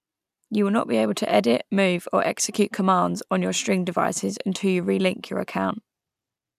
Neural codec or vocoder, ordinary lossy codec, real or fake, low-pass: none; none; real; 14.4 kHz